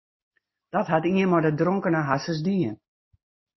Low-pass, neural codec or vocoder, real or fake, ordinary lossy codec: 7.2 kHz; none; real; MP3, 24 kbps